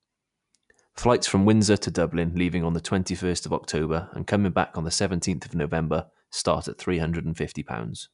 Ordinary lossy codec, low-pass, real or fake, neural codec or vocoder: none; 10.8 kHz; real; none